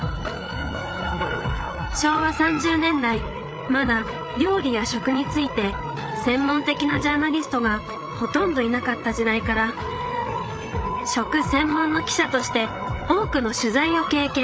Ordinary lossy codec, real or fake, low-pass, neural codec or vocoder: none; fake; none; codec, 16 kHz, 8 kbps, FreqCodec, larger model